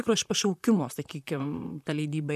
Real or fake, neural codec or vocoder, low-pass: fake; vocoder, 44.1 kHz, 128 mel bands, Pupu-Vocoder; 14.4 kHz